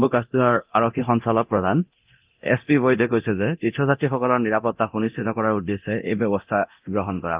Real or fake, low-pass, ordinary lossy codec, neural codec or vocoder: fake; 3.6 kHz; Opus, 24 kbps; codec, 24 kHz, 0.9 kbps, DualCodec